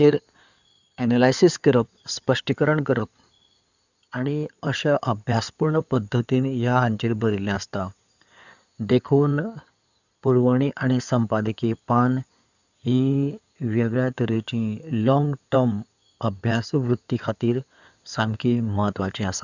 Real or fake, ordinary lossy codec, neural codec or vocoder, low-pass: fake; none; codec, 16 kHz in and 24 kHz out, 2.2 kbps, FireRedTTS-2 codec; 7.2 kHz